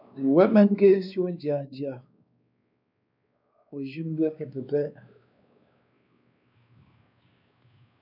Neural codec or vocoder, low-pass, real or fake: codec, 16 kHz, 2 kbps, X-Codec, WavLM features, trained on Multilingual LibriSpeech; 5.4 kHz; fake